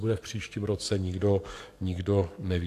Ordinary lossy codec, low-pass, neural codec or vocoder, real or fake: AAC, 64 kbps; 14.4 kHz; codec, 44.1 kHz, 7.8 kbps, DAC; fake